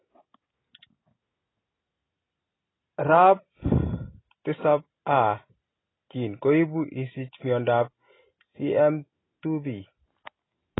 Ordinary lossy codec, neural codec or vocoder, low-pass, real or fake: AAC, 16 kbps; none; 7.2 kHz; real